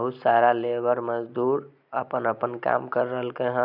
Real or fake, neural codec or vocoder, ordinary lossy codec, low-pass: real; none; none; 5.4 kHz